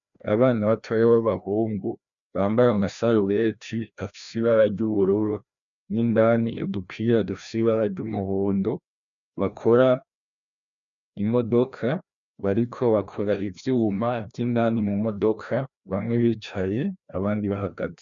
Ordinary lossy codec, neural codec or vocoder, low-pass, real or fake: MP3, 96 kbps; codec, 16 kHz, 1 kbps, FreqCodec, larger model; 7.2 kHz; fake